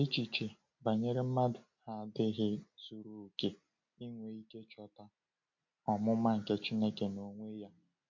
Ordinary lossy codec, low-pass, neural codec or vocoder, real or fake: MP3, 48 kbps; 7.2 kHz; none; real